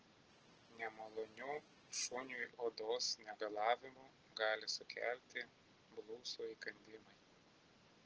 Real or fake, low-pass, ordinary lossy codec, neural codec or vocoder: real; 7.2 kHz; Opus, 16 kbps; none